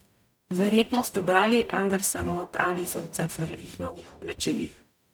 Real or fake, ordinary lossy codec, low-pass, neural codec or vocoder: fake; none; none; codec, 44.1 kHz, 0.9 kbps, DAC